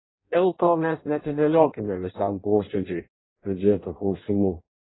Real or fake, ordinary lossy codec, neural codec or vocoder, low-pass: fake; AAC, 16 kbps; codec, 16 kHz in and 24 kHz out, 0.6 kbps, FireRedTTS-2 codec; 7.2 kHz